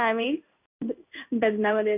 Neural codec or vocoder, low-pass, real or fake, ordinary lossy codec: codec, 16 kHz in and 24 kHz out, 1 kbps, XY-Tokenizer; 3.6 kHz; fake; none